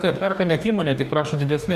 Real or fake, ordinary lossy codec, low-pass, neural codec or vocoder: fake; Opus, 64 kbps; 14.4 kHz; codec, 44.1 kHz, 2.6 kbps, DAC